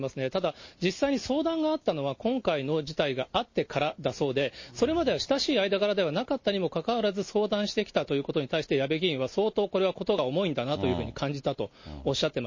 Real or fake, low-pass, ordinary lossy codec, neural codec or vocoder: real; 7.2 kHz; MP3, 32 kbps; none